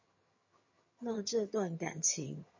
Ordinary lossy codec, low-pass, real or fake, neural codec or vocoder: MP3, 32 kbps; 7.2 kHz; fake; vocoder, 22.05 kHz, 80 mel bands, HiFi-GAN